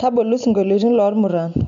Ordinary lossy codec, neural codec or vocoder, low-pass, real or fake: none; none; 7.2 kHz; real